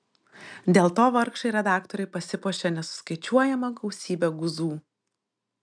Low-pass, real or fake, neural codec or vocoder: 9.9 kHz; real; none